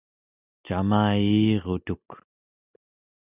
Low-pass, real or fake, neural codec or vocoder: 3.6 kHz; real; none